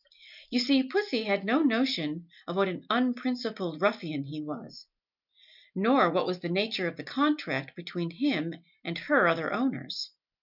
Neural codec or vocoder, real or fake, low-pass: none; real; 5.4 kHz